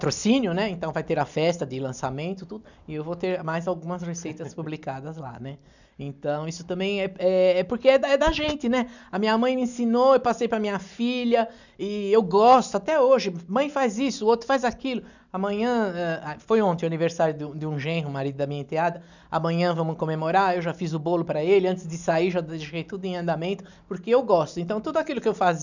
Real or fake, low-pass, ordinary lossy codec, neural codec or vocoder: real; 7.2 kHz; none; none